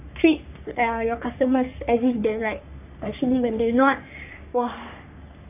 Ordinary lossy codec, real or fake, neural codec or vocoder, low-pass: none; fake; codec, 44.1 kHz, 3.4 kbps, Pupu-Codec; 3.6 kHz